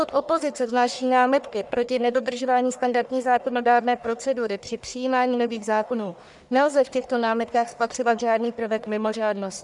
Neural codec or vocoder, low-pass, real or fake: codec, 44.1 kHz, 1.7 kbps, Pupu-Codec; 10.8 kHz; fake